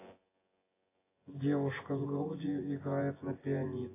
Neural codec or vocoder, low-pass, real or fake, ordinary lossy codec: vocoder, 24 kHz, 100 mel bands, Vocos; 3.6 kHz; fake; AAC, 16 kbps